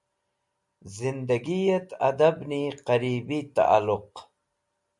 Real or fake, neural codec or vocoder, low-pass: real; none; 10.8 kHz